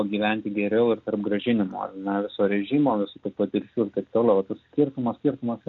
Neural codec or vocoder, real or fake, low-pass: none; real; 7.2 kHz